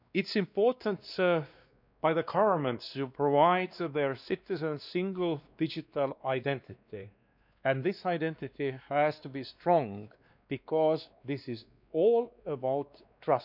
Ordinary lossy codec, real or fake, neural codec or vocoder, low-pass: none; fake; codec, 16 kHz, 2 kbps, X-Codec, WavLM features, trained on Multilingual LibriSpeech; 5.4 kHz